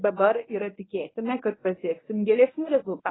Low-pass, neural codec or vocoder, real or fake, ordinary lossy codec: 7.2 kHz; codec, 24 kHz, 0.9 kbps, WavTokenizer, medium speech release version 1; fake; AAC, 16 kbps